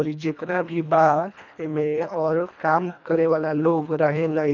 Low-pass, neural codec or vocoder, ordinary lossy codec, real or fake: 7.2 kHz; codec, 24 kHz, 1.5 kbps, HILCodec; none; fake